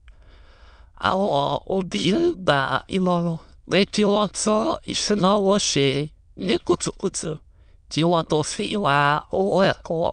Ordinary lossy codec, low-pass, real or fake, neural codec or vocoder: none; 9.9 kHz; fake; autoencoder, 22.05 kHz, a latent of 192 numbers a frame, VITS, trained on many speakers